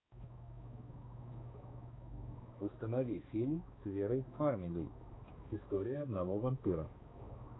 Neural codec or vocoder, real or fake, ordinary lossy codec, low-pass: codec, 16 kHz, 2 kbps, X-Codec, HuBERT features, trained on balanced general audio; fake; AAC, 16 kbps; 7.2 kHz